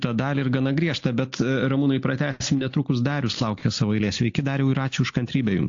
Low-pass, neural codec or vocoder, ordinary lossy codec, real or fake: 7.2 kHz; none; AAC, 48 kbps; real